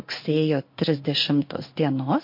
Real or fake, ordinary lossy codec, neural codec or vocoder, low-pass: fake; MP3, 32 kbps; codec, 16 kHz in and 24 kHz out, 1 kbps, XY-Tokenizer; 5.4 kHz